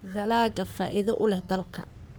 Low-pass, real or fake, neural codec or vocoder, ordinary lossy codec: none; fake; codec, 44.1 kHz, 3.4 kbps, Pupu-Codec; none